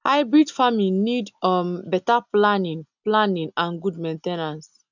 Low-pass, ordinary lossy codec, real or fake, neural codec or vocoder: 7.2 kHz; none; real; none